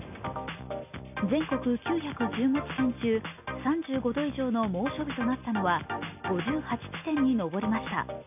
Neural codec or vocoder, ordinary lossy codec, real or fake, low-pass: none; AAC, 32 kbps; real; 3.6 kHz